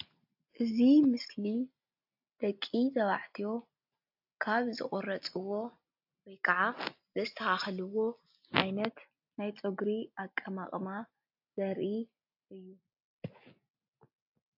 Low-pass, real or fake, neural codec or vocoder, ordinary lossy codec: 5.4 kHz; real; none; AAC, 48 kbps